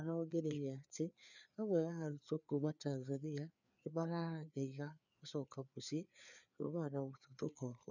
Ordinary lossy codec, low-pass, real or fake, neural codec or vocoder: none; 7.2 kHz; fake; codec, 16 kHz, 4 kbps, FreqCodec, larger model